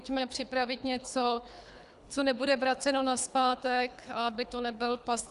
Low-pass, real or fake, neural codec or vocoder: 10.8 kHz; fake; codec, 24 kHz, 3 kbps, HILCodec